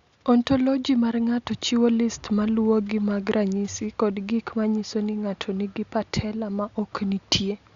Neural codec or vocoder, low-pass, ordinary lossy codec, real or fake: none; 7.2 kHz; Opus, 64 kbps; real